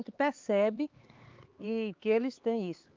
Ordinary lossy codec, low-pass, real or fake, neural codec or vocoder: Opus, 32 kbps; 7.2 kHz; fake; codec, 16 kHz, 4 kbps, X-Codec, HuBERT features, trained on LibriSpeech